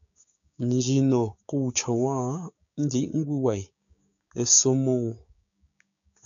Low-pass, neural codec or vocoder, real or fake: 7.2 kHz; codec, 16 kHz, 6 kbps, DAC; fake